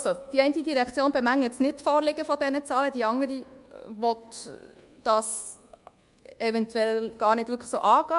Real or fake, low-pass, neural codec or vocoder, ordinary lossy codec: fake; 10.8 kHz; codec, 24 kHz, 1.2 kbps, DualCodec; MP3, 64 kbps